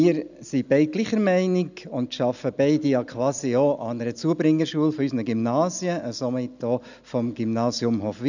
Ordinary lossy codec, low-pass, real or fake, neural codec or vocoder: none; 7.2 kHz; real; none